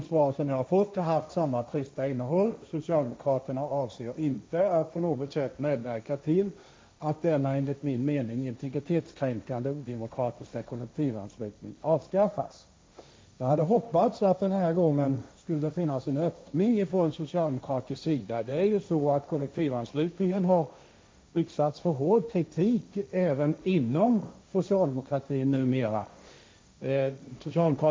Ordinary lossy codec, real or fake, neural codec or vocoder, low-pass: none; fake; codec, 16 kHz, 1.1 kbps, Voila-Tokenizer; none